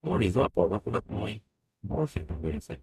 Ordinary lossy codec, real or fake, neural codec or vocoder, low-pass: none; fake; codec, 44.1 kHz, 0.9 kbps, DAC; 14.4 kHz